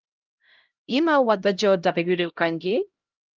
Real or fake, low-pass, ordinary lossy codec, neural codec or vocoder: fake; 7.2 kHz; Opus, 32 kbps; codec, 16 kHz, 0.5 kbps, X-Codec, HuBERT features, trained on LibriSpeech